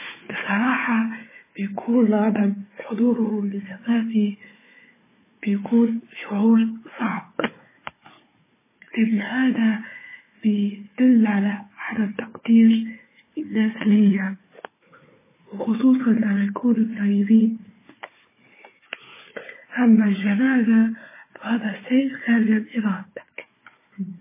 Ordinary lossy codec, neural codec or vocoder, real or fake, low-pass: MP3, 16 kbps; codec, 16 kHz, 4 kbps, FunCodec, trained on Chinese and English, 50 frames a second; fake; 3.6 kHz